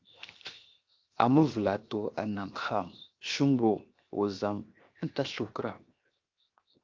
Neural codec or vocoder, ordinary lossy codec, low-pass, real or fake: codec, 16 kHz, 0.7 kbps, FocalCodec; Opus, 24 kbps; 7.2 kHz; fake